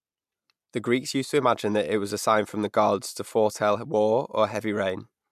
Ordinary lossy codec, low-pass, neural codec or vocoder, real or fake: MP3, 96 kbps; 14.4 kHz; none; real